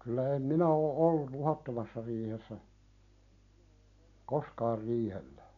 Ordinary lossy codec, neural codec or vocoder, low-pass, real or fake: none; none; 7.2 kHz; real